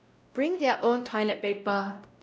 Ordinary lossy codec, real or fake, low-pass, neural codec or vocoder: none; fake; none; codec, 16 kHz, 0.5 kbps, X-Codec, WavLM features, trained on Multilingual LibriSpeech